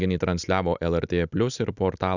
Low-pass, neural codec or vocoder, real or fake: 7.2 kHz; none; real